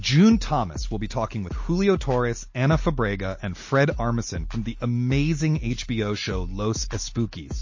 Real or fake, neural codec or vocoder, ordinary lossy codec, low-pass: real; none; MP3, 32 kbps; 7.2 kHz